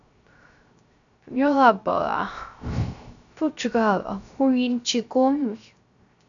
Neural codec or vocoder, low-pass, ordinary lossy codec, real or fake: codec, 16 kHz, 0.3 kbps, FocalCodec; 7.2 kHz; MP3, 96 kbps; fake